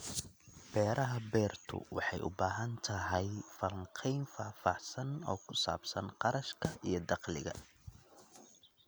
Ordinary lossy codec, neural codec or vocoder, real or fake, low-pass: none; none; real; none